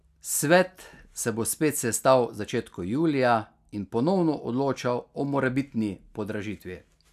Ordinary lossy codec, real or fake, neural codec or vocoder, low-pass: none; real; none; 14.4 kHz